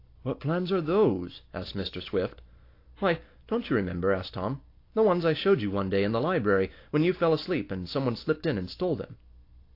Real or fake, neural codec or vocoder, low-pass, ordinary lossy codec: real; none; 5.4 kHz; AAC, 32 kbps